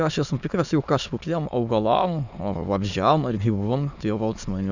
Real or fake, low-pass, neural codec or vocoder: fake; 7.2 kHz; autoencoder, 22.05 kHz, a latent of 192 numbers a frame, VITS, trained on many speakers